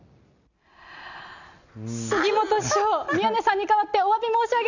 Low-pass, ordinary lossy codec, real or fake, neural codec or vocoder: 7.2 kHz; none; real; none